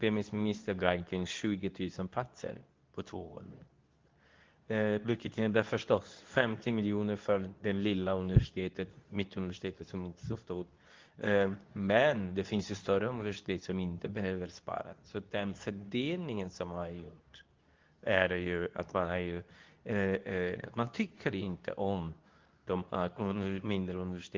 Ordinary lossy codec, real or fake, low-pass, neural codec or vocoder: Opus, 32 kbps; fake; 7.2 kHz; codec, 24 kHz, 0.9 kbps, WavTokenizer, medium speech release version 2